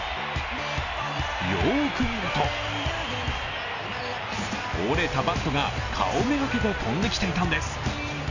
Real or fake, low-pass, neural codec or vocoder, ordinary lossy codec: real; 7.2 kHz; none; none